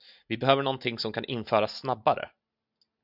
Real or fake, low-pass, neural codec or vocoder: real; 5.4 kHz; none